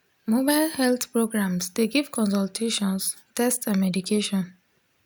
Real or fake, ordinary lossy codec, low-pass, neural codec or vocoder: real; none; none; none